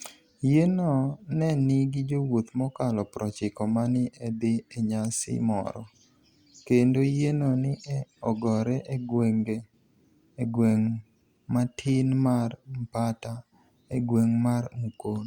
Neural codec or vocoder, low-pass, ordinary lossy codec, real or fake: none; 19.8 kHz; Opus, 64 kbps; real